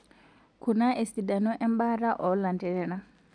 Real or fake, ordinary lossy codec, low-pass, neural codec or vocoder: real; none; 9.9 kHz; none